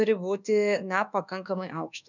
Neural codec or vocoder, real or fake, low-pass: codec, 24 kHz, 1.2 kbps, DualCodec; fake; 7.2 kHz